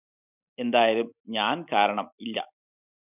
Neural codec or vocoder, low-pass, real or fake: none; 3.6 kHz; real